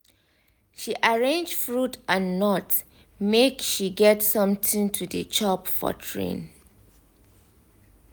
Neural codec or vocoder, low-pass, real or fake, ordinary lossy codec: none; none; real; none